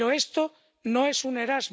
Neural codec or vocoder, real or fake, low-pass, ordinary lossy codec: none; real; none; none